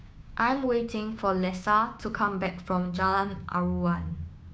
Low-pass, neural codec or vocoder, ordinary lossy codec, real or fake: none; codec, 16 kHz, 6 kbps, DAC; none; fake